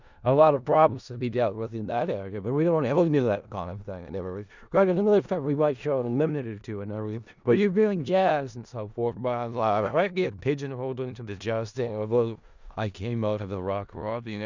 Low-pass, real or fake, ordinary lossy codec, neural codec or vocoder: 7.2 kHz; fake; Opus, 64 kbps; codec, 16 kHz in and 24 kHz out, 0.4 kbps, LongCat-Audio-Codec, four codebook decoder